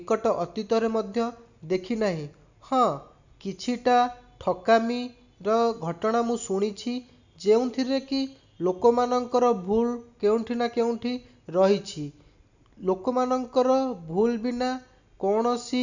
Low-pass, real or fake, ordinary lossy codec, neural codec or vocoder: 7.2 kHz; real; none; none